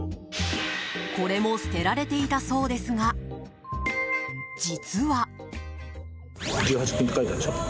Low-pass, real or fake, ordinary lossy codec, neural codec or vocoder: none; real; none; none